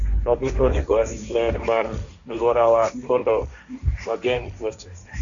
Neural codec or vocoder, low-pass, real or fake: codec, 16 kHz, 1.1 kbps, Voila-Tokenizer; 7.2 kHz; fake